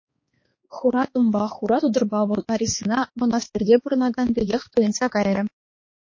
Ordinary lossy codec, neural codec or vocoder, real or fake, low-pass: MP3, 32 kbps; codec, 16 kHz, 2 kbps, X-Codec, HuBERT features, trained on balanced general audio; fake; 7.2 kHz